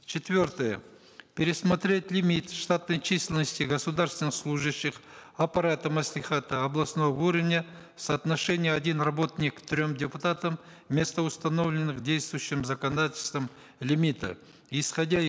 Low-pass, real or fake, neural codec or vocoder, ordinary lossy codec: none; real; none; none